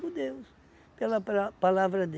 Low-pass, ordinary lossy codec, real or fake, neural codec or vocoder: none; none; real; none